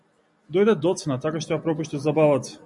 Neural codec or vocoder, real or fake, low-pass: none; real; 10.8 kHz